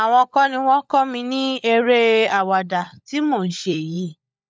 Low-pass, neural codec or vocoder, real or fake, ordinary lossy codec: none; codec, 16 kHz, 16 kbps, FunCodec, trained on LibriTTS, 50 frames a second; fake; none